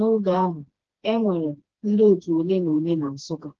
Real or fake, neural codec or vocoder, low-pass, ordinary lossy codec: fake; codec, 16 kHz, 2 kbps, FreqCodec, smaller model; 7.2 kHz; Opus, 16 kbps